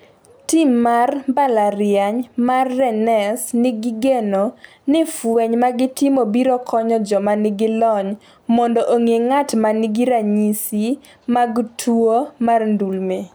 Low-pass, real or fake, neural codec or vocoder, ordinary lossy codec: none; real; none; none